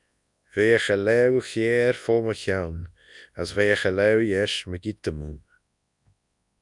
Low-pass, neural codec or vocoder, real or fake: 10.8 kHz; codec, 24 kHz, 0.9 kbps, WavTokenizer, large speech release; fake